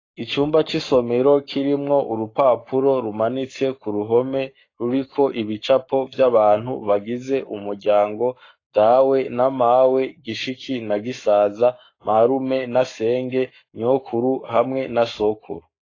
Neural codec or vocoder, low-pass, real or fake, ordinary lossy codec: codec, 44.1 kHz, 7.8 kbps, Pupu-Codec; 7.2 kHz; fake; AAC, 32 kbps